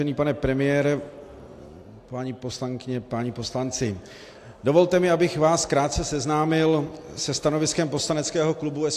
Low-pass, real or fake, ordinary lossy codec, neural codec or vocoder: 14.4 kHz; real; AAC, 64 kbps; none